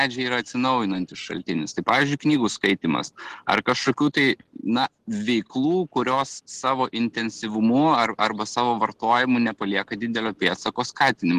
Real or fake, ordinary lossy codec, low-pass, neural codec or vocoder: real; Opus, 16 kbps; 14.4 kHz; none